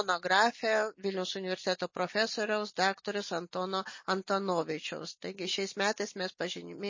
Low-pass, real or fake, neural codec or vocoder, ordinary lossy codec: 7.2 kHz; real; none; MP3, 32 kbps